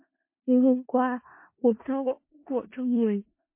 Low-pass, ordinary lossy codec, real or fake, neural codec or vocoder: 3.6 kHz; MP3, 24 kbps; fake; codec, 16 kHz in and 24 kHz out, 0.4 kbps, LongCat-Audio-Codec, four codebook decoder